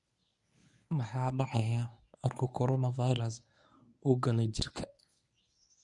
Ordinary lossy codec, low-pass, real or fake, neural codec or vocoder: none; 10.8 kHz; fake; codec, 24 kHz, 0.9 kbps, WavTokenizer, medium speech release version 1